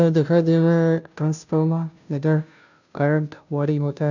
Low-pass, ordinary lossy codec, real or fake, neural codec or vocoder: 7.2 kHz; none; fake; codec, 16 kHz, 0.5 kbps, FunCodec, trained on Chinese and English, 25 frames a second